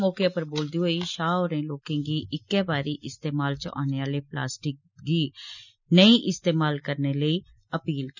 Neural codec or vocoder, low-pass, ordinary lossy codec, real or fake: none; 7.2 kHz; none; real